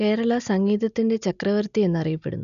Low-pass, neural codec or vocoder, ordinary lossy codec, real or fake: 7.2 kHz; none; none; real